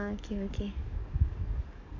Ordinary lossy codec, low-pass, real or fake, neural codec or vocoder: MP3, 64 kbps; 7.2 kHz; fake; codec, 44.1 kHz, 7.8 kbps, DAC